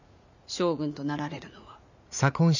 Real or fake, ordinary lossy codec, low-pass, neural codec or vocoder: fake; none; 7.2 kHz; vocoder, 44.1 kHz, 80 mel bands, Vocos